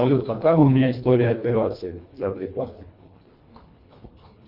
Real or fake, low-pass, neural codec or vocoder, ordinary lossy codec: fake; 5.4 kHz; codec, 24 kHz, 1.5 kbps, HILCodec; Opus, 64 kbps